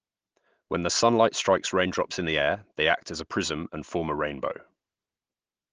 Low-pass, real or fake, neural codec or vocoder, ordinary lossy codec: 7.2 kHz; real; none; Opus, 16 kbps